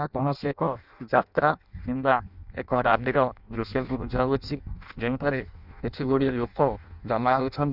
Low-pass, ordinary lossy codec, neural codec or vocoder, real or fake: 5.4 kHz; none; codec, 16 kHz in and 24 kHz out, 0.6 kbps, FireRedTTS-2 codec; fake